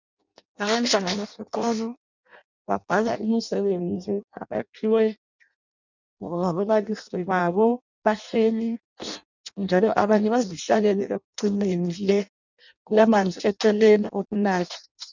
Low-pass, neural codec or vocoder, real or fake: 7.2 kHz; codec, 16 kHz in and 24 kHz out, 0.6 kbps, FireRedTTS-2 codec; fake